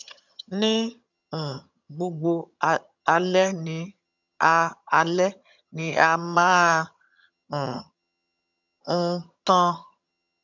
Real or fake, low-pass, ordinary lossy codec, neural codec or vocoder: fake; 7.2 kHz; none; vocoder, 22.05 kHz, 80 mel bands, HiFi-GAN